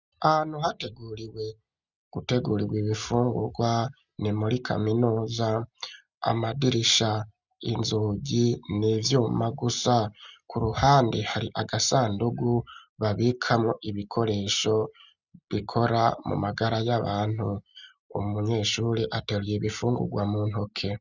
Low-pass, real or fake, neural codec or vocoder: 7.2 kHz; real; none